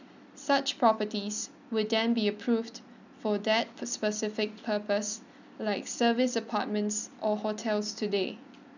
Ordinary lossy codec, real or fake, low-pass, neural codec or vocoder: none; real; 7.2 kHz; none